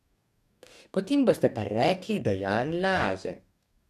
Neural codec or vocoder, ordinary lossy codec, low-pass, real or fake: codec, 44.1 kHz, 2.6 kbps, DAC; none; 14.4 kHz; fake